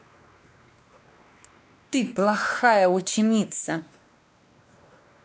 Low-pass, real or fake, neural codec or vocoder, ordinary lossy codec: none; fake; codec, 16 kHz, 2 kbps, X-Codec, WavLM features, trained on Multilingual LibriSpeech; none